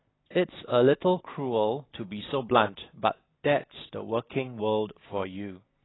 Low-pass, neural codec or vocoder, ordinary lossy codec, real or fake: 7.2 kHz; none; AAC, 16 kbps; real